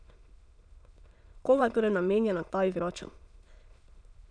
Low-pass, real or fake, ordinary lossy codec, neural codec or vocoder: 9.9 kHz; fake; none; autoencoder, 22.05 kHz, a latent of 192 numbers a frame, VITS, trained on many speakers